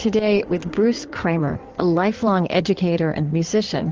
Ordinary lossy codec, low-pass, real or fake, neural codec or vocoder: Opus, 16 kbps; 7.2 kHz; fake; codec, 16 kHz in and 24 kHz out, 2.2 kbps, FireRedTTS-2 codec